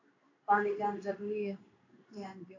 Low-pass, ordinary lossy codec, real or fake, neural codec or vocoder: 7.2 kHz; AAC, 48 kbps; fake; codec, 16 kHz in and 24 kHz out, 1 kbps, XY-Tokenizer